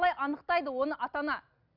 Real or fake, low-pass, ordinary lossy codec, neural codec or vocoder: real; 5.4 kHz; none; none